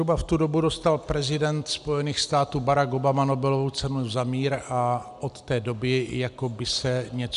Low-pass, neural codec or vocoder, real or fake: 10.8 kHz; none; real